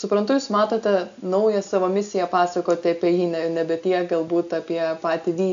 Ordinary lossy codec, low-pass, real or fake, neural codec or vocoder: AAC, 96 kbps; 7.2 kHz; real; none